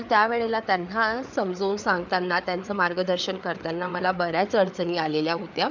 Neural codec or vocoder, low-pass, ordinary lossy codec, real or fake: codec, 16 kHz, 4 kbps, FreqCodec, larger model; 7.2 kHz; none; fake